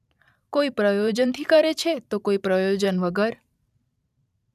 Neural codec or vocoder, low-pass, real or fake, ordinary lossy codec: vocoder, 44.1 kHz, 128 mel bands every 512 samples, BigVGAN v2; 14.4 kHz; fake; none